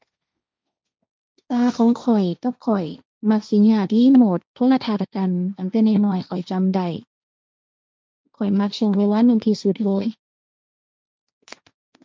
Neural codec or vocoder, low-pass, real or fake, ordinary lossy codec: codec, 16 kHz, 1.1 kbps, Voila-Tokenizer; none; fake; none